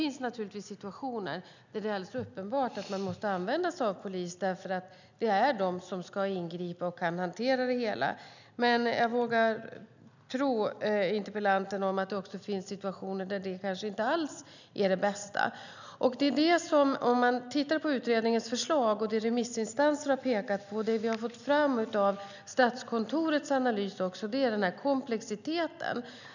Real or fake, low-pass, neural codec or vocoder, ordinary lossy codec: real; 7.2 kHz; none; none